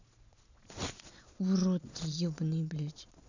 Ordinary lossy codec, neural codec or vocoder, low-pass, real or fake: none; vocoder, 22.05 kHz, 80 mel bands, Vocos; 7.2 kHz; fake